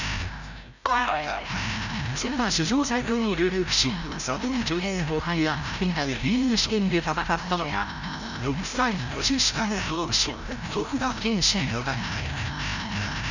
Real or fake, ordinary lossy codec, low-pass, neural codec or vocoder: fake; none; 7.2 kHz; codec, 16 kHz, 0.5 kbps, FreqCodec, larger model